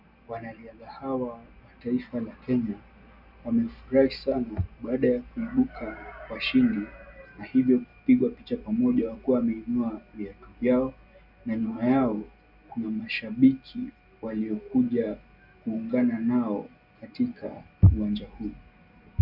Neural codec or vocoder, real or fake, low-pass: none; real; 5.4 kHz